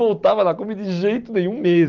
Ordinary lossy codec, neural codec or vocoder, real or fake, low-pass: Opus, 24 kbps; none; real; 7.2 kHz